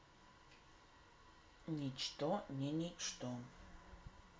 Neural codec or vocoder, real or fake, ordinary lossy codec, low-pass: none; real; none; none